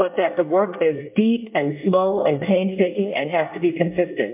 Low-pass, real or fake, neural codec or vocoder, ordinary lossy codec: 3.6 kHz; fake; codec, 24 kHz, 1 kbps, SNAC; MP3, 32 kbps